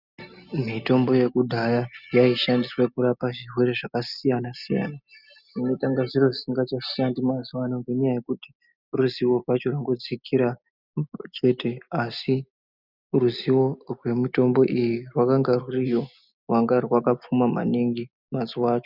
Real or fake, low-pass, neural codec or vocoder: real; 5.4 kHz; none